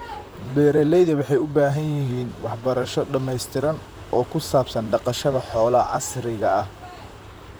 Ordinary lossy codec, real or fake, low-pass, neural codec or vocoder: none; fake; none; vocoder, 44.1 kHz, 128 mel bands, Pupu-Vocoder